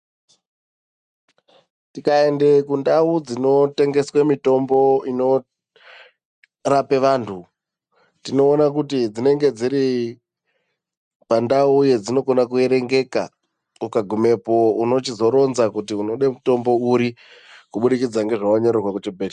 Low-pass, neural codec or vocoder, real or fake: 10.8 kHz; none; real